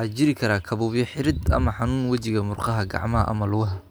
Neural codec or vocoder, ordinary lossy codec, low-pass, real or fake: none; none; none; real